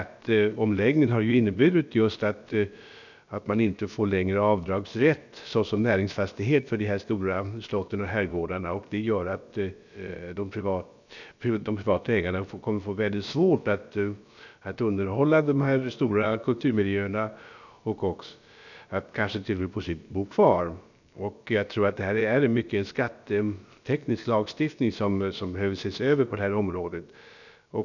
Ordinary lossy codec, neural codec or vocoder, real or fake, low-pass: none; codec, 16 kHz, about 1 kbps, DyCAST, with the encoder's durations; fake; 7.2 kHz